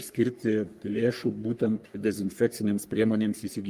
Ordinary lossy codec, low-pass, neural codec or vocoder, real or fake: Opus, 32 kbps; 14.4 kHz; codec, 44.1 kHz, 3.4 kbps, Pupu-Codec; fake